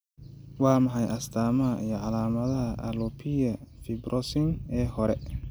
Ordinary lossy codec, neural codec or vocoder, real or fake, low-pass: none; none; real; none